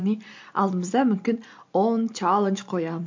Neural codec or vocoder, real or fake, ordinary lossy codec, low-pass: none; real; MP3, 48 kbps; 7.2 kHz